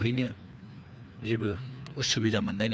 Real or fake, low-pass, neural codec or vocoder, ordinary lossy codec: fake; none; codec, 16 kHz, 2 kbps, FreqCodec, larger model; none